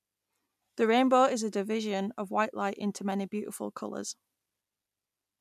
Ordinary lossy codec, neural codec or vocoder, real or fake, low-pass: none; none; real; 14.4 kHz